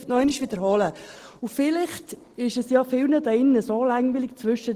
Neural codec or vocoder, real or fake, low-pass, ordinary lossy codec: none; real; 14.4 kHz; Opus, 16 kbps